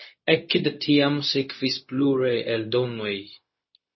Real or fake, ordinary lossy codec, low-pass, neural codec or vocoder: fake; MP3, 24 kbps; 7.2 kHz; codec, 16 kHz, 0.4 kbps, LongCat-Audio-Codec